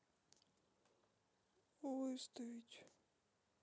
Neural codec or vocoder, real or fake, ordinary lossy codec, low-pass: none; real; none; none